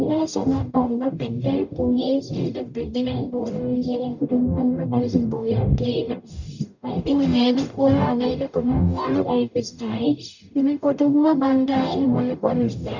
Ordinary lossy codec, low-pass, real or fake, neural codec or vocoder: none; 7.2 kHz; fake; codec, 44.1 kHz, 0.9 kbps, DAC